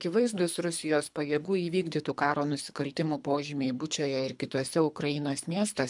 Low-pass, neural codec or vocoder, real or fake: 10.8 kHz; codec, 24 kHz, 3 kbps, HILCodec; fake